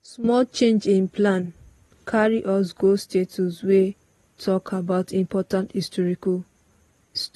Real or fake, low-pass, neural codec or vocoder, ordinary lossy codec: real; 19.8 kHz; none; AAC, 32 kbps